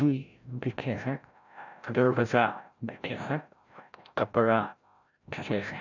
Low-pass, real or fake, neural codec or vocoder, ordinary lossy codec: 7.2 kHz; fake; codec, 16 kHz, 0.5 kbps, FreqCodec, larger model; none